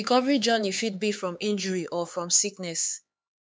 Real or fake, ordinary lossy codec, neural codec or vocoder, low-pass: fake; none; codec, 16 kHz, 4 kbps, X-Codec, HuBERT features, trained on LibriSpeech; none